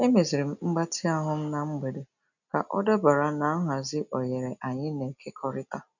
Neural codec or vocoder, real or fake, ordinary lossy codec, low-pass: none; real; none; 7.2 kHz